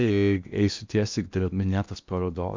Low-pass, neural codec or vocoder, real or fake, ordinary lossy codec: 7.2 kHz; codec, 16 kHz in and 24 kHz out, 0.8 kbps, FocalCodec, streaming, 65536 codes; fake; MP3, 64 kbps